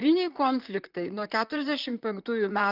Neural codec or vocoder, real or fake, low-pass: vocoder, 44.1 kHz, 128 mel bands, Pupu-Vocoder; fake; 5.4 kHz